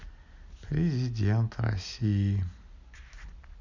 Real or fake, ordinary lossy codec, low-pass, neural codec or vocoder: real; none; 7.2 kHz; none